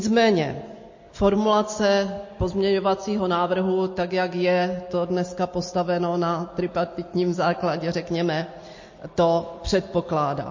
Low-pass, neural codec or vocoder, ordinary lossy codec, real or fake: 7.2 kHz; none; MP3, 32 kbps; real